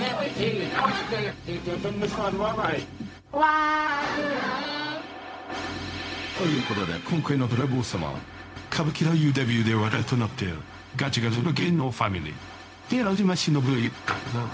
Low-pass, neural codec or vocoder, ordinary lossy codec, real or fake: none; codec, 16 kHz, 0.4 kbps, LongCat-Audio-Codec; none; fake